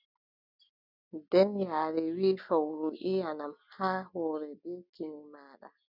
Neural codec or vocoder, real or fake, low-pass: none; real; 5.4 kHz